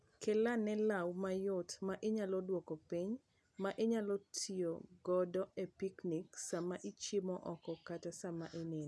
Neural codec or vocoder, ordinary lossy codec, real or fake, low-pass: none; none; real; none